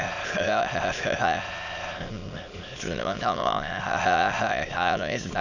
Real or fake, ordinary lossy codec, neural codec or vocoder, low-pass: fake; none; autoencoder, 22.05 kHz, a latent of 192 numbers a frame, VITS, trained on many speakers; 7.2 kHz